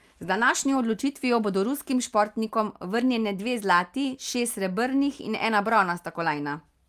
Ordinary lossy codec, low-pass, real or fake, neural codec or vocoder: Opus, 32 kbps; 14.4 kHz; real; none